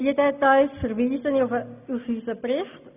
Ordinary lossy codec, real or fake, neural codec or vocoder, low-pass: none; real; none; 3.6 kHz